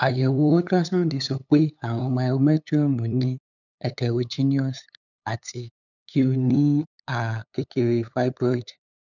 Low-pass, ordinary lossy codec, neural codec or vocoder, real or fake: 7.2 kHz; none; codec, 16 kHz, 8 kbps, FunCodec, trained on LibriTTS, 25 frames a second; fake